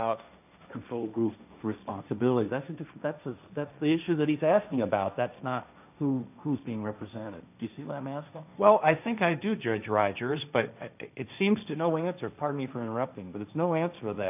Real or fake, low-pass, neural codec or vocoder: fake; 3.6 kHz; codec, 16 kHz, 1.1 kbps, Voila-Tokenizer